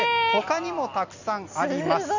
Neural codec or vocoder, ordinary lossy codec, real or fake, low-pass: none; none; real; 7.2 kHz